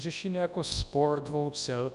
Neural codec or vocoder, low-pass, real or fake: codec, 24 kHz, 0.9 kbps, WavTokenizer, large speech release; 10.8 kHz; fake